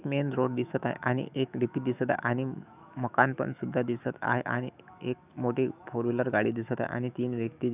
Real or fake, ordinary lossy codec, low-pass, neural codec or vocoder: fake; none; 3.6 kHz; autoencoder, 48 kHz, 128 numbers a frame, DAC-VAE, trained on Japanese speech